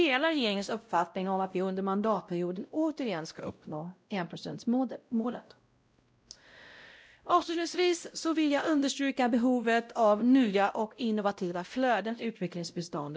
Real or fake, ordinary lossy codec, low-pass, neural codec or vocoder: fake; none; none; codec, 16 kHz, 0.5 kbps, X-Codec, WavLM features, trained on Multilingual LibriSpeech